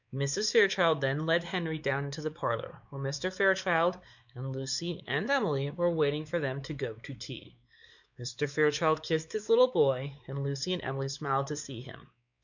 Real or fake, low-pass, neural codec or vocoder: fake; 7.2 kHz; codec, 16 kHz, 4 kbps, X-Codec, WavLM features, trained on Multilingual LibriSpeech